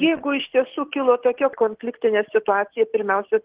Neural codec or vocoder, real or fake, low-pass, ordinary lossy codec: none; real; 3.6 kHz; Opus, 16 kbps